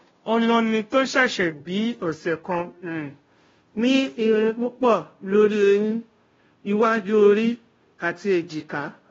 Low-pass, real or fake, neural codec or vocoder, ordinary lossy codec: 7.2 kHz; fake; codec, 16 kHz, 0.5 kbps, FunCodec, trained on Chinese and English, 25 frames a second; AAC, 24 kbps